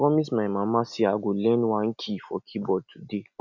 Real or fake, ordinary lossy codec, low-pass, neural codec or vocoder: real; none; 7.2 kHz; none